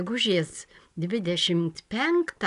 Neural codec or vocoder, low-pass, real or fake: none; 10.8 kHz; real